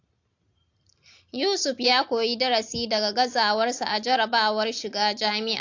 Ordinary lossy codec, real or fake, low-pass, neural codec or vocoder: AAC, 48 kbps; real; 7.2 kHz; none